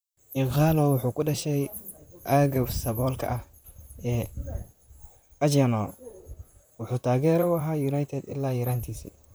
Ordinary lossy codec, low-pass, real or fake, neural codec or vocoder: none; none; fake; vocoder, 44.1 kHz, 128 mel bands, Pupu-Vocoder